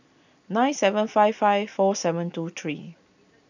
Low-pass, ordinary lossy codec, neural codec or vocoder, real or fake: 7.2 kHz; none; none; real